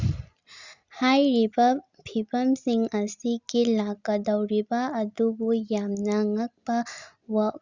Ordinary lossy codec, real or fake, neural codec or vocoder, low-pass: Opus, 64 kbps; real; none; 7.2 kHz